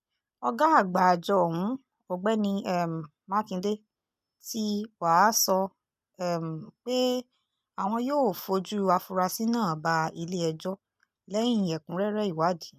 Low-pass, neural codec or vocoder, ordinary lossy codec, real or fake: 14.4 kHz; none; none; real